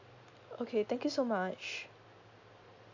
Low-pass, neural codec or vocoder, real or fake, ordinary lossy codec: 7.2 kHz; none; real; AAC, 48 kbps